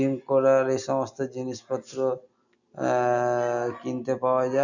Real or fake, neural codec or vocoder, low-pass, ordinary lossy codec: real; none; 7.2 kHz; none